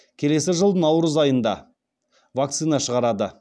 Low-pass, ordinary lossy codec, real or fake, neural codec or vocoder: none; none; real; none